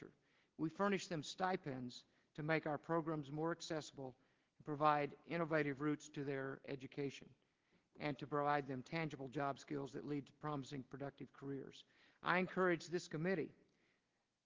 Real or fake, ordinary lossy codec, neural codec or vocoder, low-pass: real; Opus, 16 kbps; none; 7.2 kHz